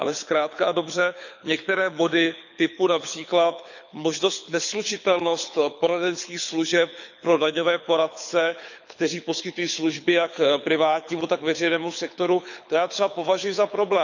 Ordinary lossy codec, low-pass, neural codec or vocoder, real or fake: none; 7.2 kHz; codec, 24 kHz, 6 kbps, HILCodec; fake